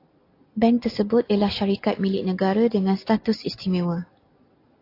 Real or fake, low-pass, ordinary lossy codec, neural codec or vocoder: real; 5.4 kHz; AAC, 32 kbps; none